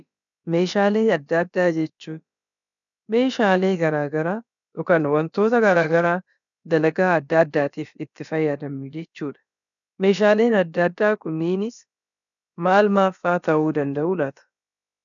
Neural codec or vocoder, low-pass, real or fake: codec, 16 kHz, about 1 kbps, DyCAST, with the encoder's durations; 7.2 kHz; fake